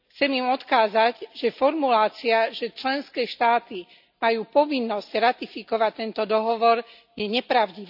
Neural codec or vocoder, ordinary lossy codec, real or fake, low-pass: none; none; real; 5.4 kHz